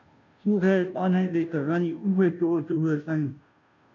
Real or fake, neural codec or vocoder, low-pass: fake; codec, 16 kHz, 0.5 kbps, FunCodec, trained on Chinese and English, 25 frames a second; 7.2 kHz